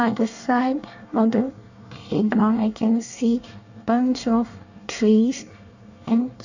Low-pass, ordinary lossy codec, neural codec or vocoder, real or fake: 7.2 kHz; none; codec, 24 kHz, 1 kbps, SNAC; fake